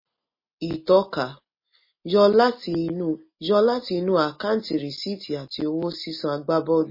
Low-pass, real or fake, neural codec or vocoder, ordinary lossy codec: 5.4 kHz; real; none; MP3, 24 kbps